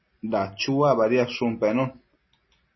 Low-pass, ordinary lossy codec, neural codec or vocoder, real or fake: 7.2 kHz; MP3, 24 kbps; none; real